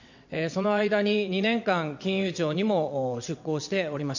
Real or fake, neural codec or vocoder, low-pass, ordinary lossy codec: fake; vocoder, 22.05 kHz, 80 mel bands, WaveNeXt; 7.2 kHz; none